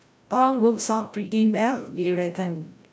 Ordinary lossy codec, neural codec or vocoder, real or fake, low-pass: none; codec, 16 kHz, 0.5 kbps, FreqCodec, larger model; fake; none